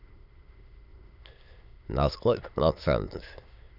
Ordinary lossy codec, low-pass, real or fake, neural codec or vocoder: none; 5.4 kHz; fake; autoencoder, 22.05 kHz, a latent of 192 numbers a frame, VITS, trained on many speakers